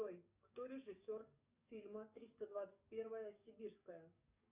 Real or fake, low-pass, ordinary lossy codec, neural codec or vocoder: fake; 3.6 kHz; Opus, 64 kbps; codec, 44.1 kHz, 7.8 kbps, DAC